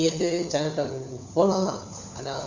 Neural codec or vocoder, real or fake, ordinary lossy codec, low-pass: codec, 16 kHz, 4 kbps, FunCodec, trained on LibriTTS, 50 frames a second; fake; none; 7.2 kHz